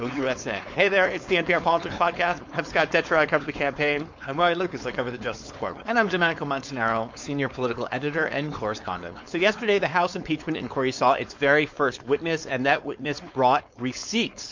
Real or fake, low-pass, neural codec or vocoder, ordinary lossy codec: fake; 7.2 kHz; codec, 16 kHz, 4.8 kbps, FACodec; MP3, 48 kbps